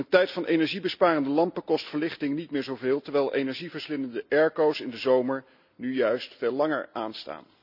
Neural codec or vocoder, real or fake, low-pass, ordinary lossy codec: none; real; 5.4 kHz; none